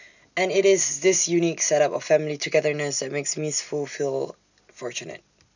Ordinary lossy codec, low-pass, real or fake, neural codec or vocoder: none; 7.2 kHz; real; none